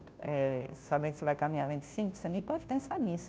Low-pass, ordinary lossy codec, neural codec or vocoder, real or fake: none; none; codec, 16 kHz, 0.5 kbps, FunCodec, trained on Chinese and English, 25 frames a second; fake